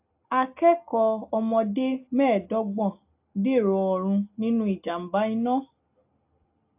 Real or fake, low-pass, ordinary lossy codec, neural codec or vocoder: real; 3.6 kHz; AAC, 32 kbps; none